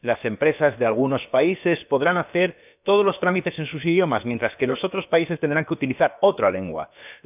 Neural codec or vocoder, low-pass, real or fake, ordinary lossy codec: codec, 16 kHz, about 1 kbps, DyCAST, with the encoder's durations; 3.6 kHz; fake; none